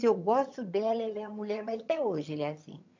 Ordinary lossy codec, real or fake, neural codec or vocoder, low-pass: MP3, 64 kbps; fake; vocoder, 22.05 kHz, 80 mel bands, HiFi-GAN; 7.2 kHz